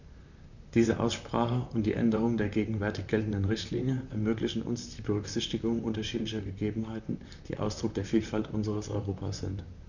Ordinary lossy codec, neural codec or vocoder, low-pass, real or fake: none; vocoder, 44.1 kHz, 128 mel bands, Pupu-Vocoder; 7.2 kHz; fake